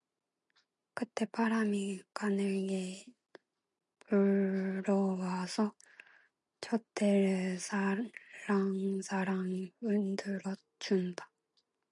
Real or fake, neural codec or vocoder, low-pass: real; none; 10.8 kHz